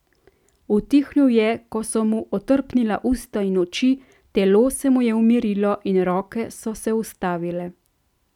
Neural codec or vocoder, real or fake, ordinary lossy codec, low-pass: none; real; none; 19.8 kHz